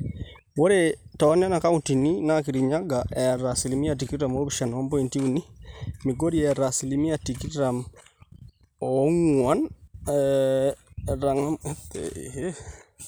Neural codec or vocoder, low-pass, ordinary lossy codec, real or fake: none; none; none; real